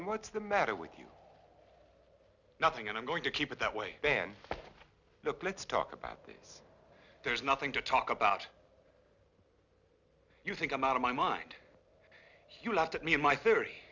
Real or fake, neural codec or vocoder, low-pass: real; none; 7.2 kHz